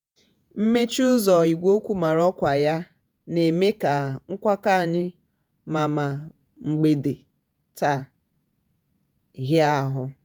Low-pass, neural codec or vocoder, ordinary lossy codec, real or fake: none; vocoder, 48 kHz, 128 mel bands, Vocos; none; fake